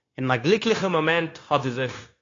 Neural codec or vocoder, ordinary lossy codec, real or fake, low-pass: codec, 16 kHz, 0.9 kbps, LongCat-Audio-Codec; AAC, 32 kbps; fake; 7.2 kHz